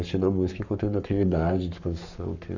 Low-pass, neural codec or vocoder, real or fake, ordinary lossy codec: 7.2 kHz; codec, 44.1 kHz, 7.8 kbps, Pupu-Codec; fake; none